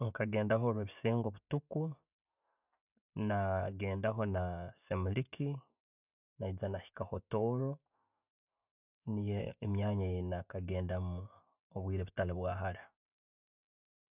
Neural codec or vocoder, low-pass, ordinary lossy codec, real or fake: none; 3.6 kHz; none; real